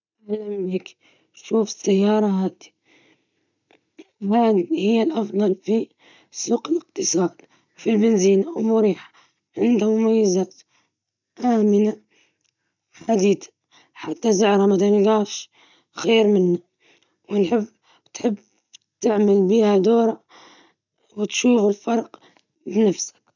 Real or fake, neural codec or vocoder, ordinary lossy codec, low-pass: real; none; none; 7.2 kHz